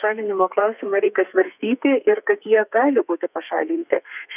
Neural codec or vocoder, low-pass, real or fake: codec, 32 kHz, 1.9 kbps, SNAC; 3.6 kHz; fake